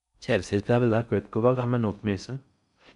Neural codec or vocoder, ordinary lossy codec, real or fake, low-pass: codec, 16 kHz in and 24 kHz out, 0.6 kbps, FocalCodec, streaming, 4096 codes; none; fake; 10.8 kHz